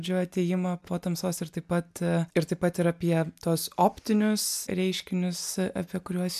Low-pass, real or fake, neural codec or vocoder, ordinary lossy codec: 14.4 kHz; real; none; MP3, 96 kbps